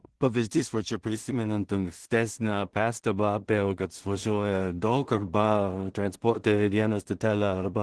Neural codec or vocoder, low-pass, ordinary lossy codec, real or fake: codec, 16 kHz in and 24 kHz out, 0.4 kbps, LongCat-Audio-Codec, two codebook decoder; 10.8 kHz; Opus, 16 kbps; fake